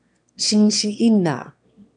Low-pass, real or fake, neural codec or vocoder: 9.9 kHz; fake; autoencoder, 22.05 kHz, a latent of 192 numbers a frame, VITS, trained on one speaker